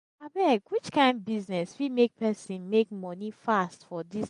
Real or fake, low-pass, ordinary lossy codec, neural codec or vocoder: real; 10.8 kHz; MP3, 48 kbps; none